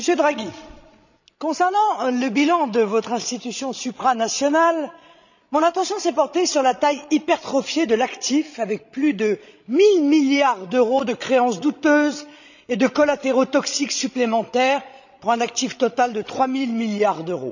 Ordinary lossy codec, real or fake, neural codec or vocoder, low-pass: none; fake; codec, 16 kHz, 16 kbps, FreqCodec, larger model; 7.2 kHz